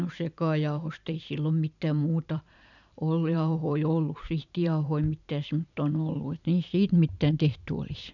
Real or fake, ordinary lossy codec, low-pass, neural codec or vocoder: fake; none; 7.2 kHz; vocoder, 44.1 kHz, 128 mel bands every 512 samples, BigVGAN v2